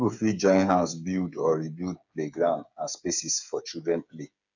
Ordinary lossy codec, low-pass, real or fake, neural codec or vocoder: none; 7.2 kHz; fake; codec, 16 kHz, 8 kbps, FreqCodec, smaller model